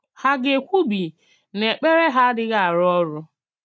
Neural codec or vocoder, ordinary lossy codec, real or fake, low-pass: none; none; real; none